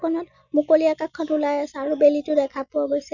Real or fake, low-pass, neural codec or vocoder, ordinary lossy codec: real; 7.2 kHz; none; none